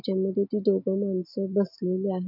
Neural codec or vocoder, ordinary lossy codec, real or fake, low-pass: none; none; real; 5.4 kHz